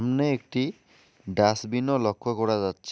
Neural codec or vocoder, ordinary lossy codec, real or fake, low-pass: none; none; real; none